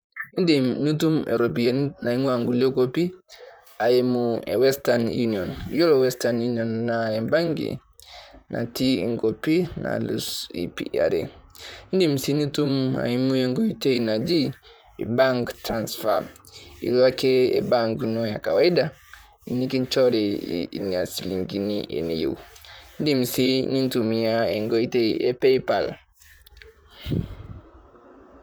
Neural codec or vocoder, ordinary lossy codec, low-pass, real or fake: vocoder, 44.1 kHz, 128 mel bands, Pupu-Vocoder; none; none; fake